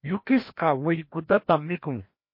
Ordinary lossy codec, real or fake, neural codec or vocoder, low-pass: MP3, 32 kbps; fake; codec, 16 kHz, 1 kbps, FreqCodec, larger model; 5.4 kHz